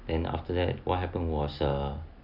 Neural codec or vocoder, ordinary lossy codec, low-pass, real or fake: none; none; 5.4 kHz; real